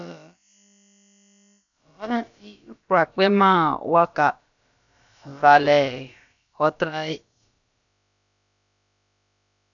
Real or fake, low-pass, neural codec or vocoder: fake; 7.2 kHz; codec, 16 kHz, about 1 kbps, DyCAST, with the encoder's durations